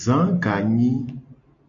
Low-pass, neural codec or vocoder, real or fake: 7.2 kHz; none; real